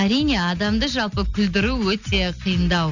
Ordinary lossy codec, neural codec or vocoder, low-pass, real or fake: none; none; 7.2 kHz; real